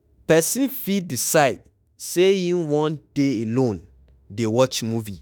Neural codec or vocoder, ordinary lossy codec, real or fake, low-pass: autoencoder, 48 kHz, 32 numbers a frame, DAC-VAE, trained on Japanese speech; none; fake; none